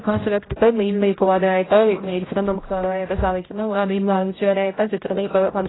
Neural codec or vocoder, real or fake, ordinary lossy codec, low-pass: codec, 16 kHz, 0.5 kbps, X-Codec, HuBERT features, trained on general audio; fake; AAC, 16 kbps; 7.2 kHz